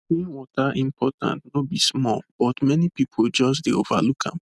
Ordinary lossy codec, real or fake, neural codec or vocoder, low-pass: none; real; none; none